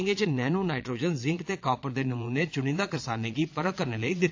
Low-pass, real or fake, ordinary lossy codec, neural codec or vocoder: 7.2 kHz; fake; AAC, 48 kbps; vocoder, 22.05 kHz, 80 mel bands, Vocos